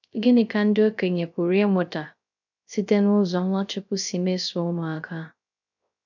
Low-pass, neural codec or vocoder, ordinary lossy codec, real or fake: 7.2 kHz; codec, 16 kHz, 0.3 kbps, FocalCodec; none; fake